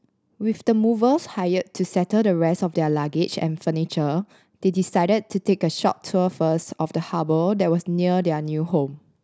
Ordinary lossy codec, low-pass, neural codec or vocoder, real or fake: none; none; none; real